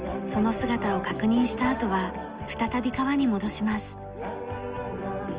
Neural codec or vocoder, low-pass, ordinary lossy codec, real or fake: none; 3.6 kHz; Opus, 24 kbps; real